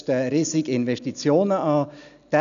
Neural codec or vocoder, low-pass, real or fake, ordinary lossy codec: none; 7.2 kHz; real; none